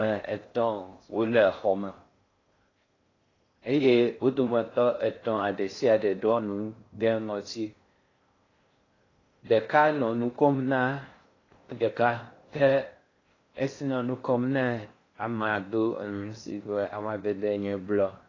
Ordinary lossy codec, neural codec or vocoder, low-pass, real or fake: AAC, 32 kbps; codec, 16 kHz in and 24 kHz out, 0.6 kbps, FocalCodec, streaming, 4096 codes; 7.2 kHz; fake